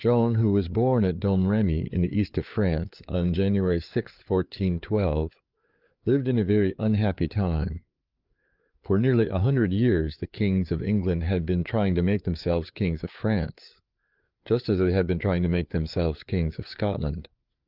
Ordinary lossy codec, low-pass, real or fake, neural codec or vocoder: Opus, 24 kbps; 5.4 kHz; fake; codec, 16 kHz, 4 kbps, FreqCodec, larger model